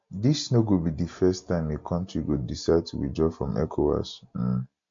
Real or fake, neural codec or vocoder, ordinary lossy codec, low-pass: real; none; MP3, 48 kbps; 7.2 kHz